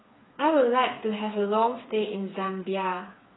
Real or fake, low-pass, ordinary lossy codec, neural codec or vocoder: fake; 7.2 kHz; AAC, 16 kbps; codec, 16 kHz, 4 kbps, FreqCodec, smaller model